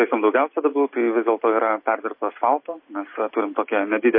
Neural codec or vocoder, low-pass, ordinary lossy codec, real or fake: none; 5.4 kHz; MP3, 24 kbps; real